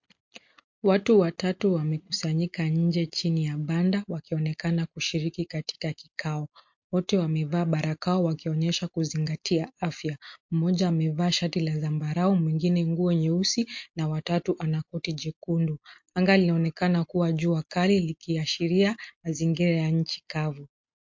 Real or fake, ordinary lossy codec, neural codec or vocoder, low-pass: real; MP3, 48 kbps; none; 7.2 kHz